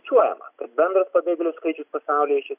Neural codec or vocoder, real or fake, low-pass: none; real; 3.6 kHz